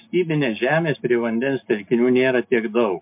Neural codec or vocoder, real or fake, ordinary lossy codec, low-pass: none; real; MP3, 32 kbps; 3.6 kHz